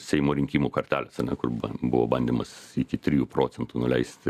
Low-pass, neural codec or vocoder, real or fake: 14.4 kHz; none; real